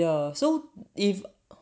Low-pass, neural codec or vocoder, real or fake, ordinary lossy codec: none; none; real; none